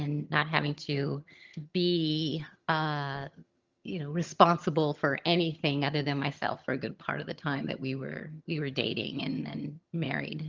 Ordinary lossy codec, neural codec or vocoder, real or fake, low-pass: Opus, 32 kbps; vocoder, 22.05 kHz, 80 mel bands, HiFi-GAN; fake; 7.2 kHz